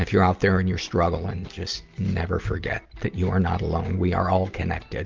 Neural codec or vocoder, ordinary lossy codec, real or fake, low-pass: none; Opus, 32 kbps; real; 7.2 kHz